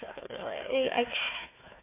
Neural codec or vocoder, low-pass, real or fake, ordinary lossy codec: codec, 16 kHz, 1 kbps, FunCodec, trained on Chinese and English, 50 frames a second; 3.6 kHz; fake; MP3, 24 kbps